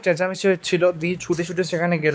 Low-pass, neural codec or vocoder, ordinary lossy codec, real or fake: none; codec, 16 kHz, 4 kbps, X-Codec, WavLM features, trained on Multilingual LibriSpeech; none; fake